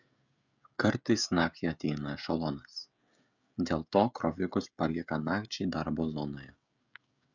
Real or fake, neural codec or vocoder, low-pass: fake; codec, 16 kHz, 16 kbps, FreqCodec, smaller model; 7.2 kHz